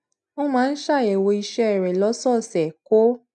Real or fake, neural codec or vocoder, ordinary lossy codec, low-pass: real; none; none; 10.8 kHz